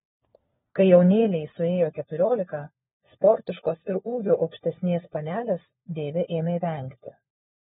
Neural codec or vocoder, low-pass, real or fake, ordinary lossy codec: codec, 16 kHz, 4 kbps, FunCodec, trained on LibriTTS, 50 frames a second; 7.2 kHz; fake; AAC, 16 kbps